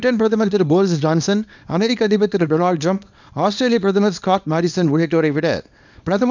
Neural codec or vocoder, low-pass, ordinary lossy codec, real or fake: codec, 24 kHz, 0.9 kbps, WavTokenizer, small release; 7.2 kHz; none; fake